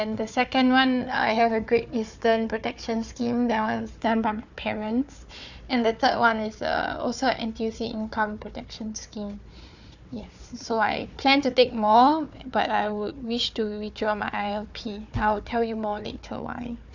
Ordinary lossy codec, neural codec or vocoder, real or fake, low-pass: none; codec, 16 kHz, 4 kbps, FunCodec, trained on Chinese and English, 50 frames a second; fake; 7.2 kHz